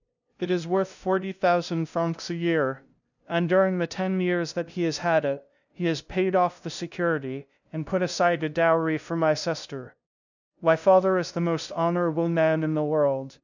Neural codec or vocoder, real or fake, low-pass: codec, 16 kHz, 0.5 kbps, FunCodec, trained on LibriTTS, 25 frames a second; fake; 7.2 kHz